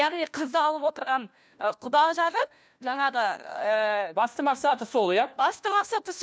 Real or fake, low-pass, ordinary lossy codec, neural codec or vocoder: fake; none; none; codec, 16 kHz, 1 kbps, FunCodec, trained on LibriTTS, 50 frames a second